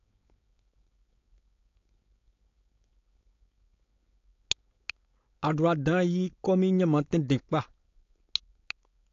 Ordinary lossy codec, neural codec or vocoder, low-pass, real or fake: AAC, 48 kbps; codec, 16 kHz, 4.8 kbps, FACodec; 7.2 kHz; fake